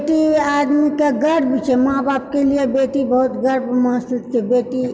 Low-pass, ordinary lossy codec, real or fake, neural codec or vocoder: none; none; real; none